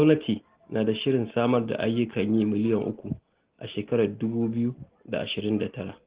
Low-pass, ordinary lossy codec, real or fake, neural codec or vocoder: 3.6 kHz; Opus, 16 kbps; real; none